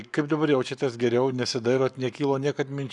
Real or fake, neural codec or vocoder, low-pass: fake; codec, 44.1 kHz, 7.8 kbps, Pupu-Codec; 10.8 kHz